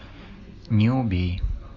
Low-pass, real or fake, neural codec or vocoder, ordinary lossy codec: 7.2 kHz; real; none; AAC, 48 kbps